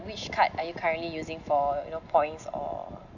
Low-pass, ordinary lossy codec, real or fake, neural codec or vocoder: 7.2 kHz; none; real; none